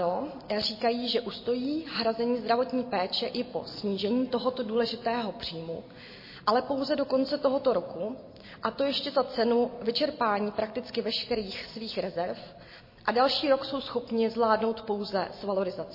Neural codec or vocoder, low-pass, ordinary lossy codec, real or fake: none; 5.4 kHz; MP3, 24 kbps; real